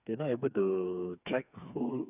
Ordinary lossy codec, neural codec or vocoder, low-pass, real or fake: none; codec, 16 kHz, 4 kbps, FreqCodec, smaller model; 3.6 kHz; fake